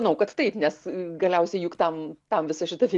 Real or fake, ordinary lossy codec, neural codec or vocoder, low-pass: real; MP3, 96 kbps; none; 10.8 kHz